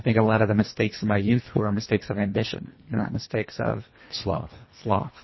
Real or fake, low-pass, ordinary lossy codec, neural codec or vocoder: fake; 7.2 kHz; MP3, 24 kbps; codec, 24 kHz, 1.5 kbps, HILCodec